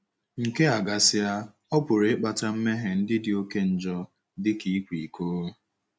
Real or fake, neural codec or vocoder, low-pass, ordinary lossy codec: real; none; none; none